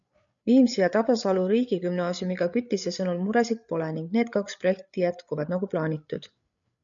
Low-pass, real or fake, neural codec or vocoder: 7.2 kHz; fake; codec, 16 kHz, 8 kbps, FreqCodec, larger model